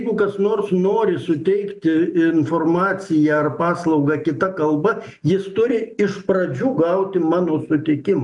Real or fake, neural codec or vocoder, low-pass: real; none; 10.8 kHz